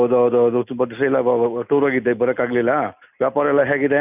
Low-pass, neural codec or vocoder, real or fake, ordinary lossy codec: 3.6 kHz; none; real; none